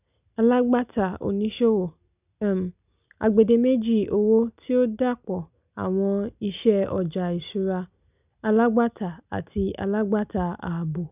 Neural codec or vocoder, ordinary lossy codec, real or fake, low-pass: none; none; real; 3.6 kHz